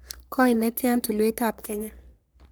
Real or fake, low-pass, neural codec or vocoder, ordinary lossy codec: fake; none; codec, 44.1 kHz, 3.4 kbps, Pupu-Codec; none